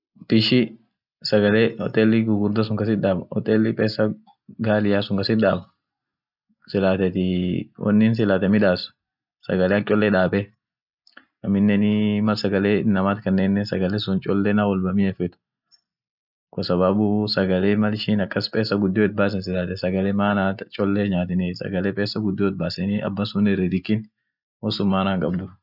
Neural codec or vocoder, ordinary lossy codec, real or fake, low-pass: none; none; real; 5.4 kHz